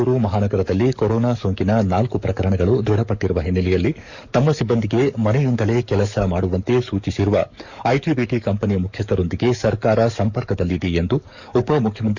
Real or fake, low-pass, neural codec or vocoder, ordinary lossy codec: fake; 7.2 kHz; codec, 44.1 kHz, 7.8 kbps, DAC; none